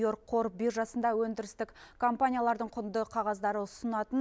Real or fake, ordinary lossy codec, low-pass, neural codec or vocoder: real; none; none; none